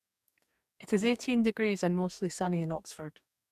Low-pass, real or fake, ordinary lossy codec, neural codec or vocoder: 14.4 kHz; fake; none; codec, 44.1 kHz, 2.6 kbps, DAC